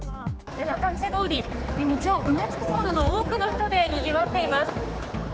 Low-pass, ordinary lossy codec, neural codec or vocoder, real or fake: none; none; codec, 16 kHz, 4 kbps, X-Codec, HuBERT features, trained on general audio; fake